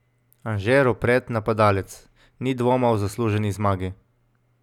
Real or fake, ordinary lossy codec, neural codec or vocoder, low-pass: real; none; none; 19.8 kHz